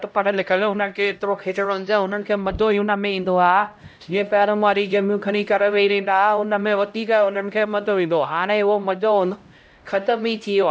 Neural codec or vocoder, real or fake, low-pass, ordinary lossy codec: codec, 16 kHz, 0.5 kbps, X-Codec, HuBERT features, trained on LibriSpeech; fake; none; none